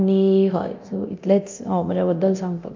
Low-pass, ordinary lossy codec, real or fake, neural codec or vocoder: 7.2 kHz; MP3, 48 kbps; fake; codec, 24 kHz, 0.9 kbps, DualCodec